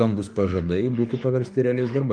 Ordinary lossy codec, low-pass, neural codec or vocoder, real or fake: Opus, 32 kbps; 9.9 kHz; autoencoder, 48 kHz, 32 numbers a frame, DAC-VAE, trained on Japanese speech; fake